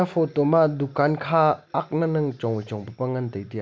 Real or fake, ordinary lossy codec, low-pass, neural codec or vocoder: real; none; none; none